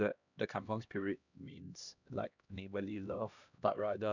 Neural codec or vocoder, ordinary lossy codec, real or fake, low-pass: codec, 16 kHz, 1 kbps, X-Codec, HuBERT features, trained on LibriSpeech; none; fake; 7.2 kHz